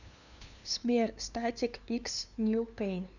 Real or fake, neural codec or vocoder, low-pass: fake; codec, 16 kHz, 2 kbps, FunCodec, trained on LibriTTS, 25 frames a second; 7.2 kHz